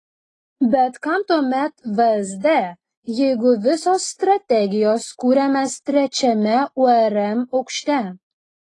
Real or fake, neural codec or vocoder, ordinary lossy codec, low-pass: real; none; AAC, 32 kbps; 10.8 kHz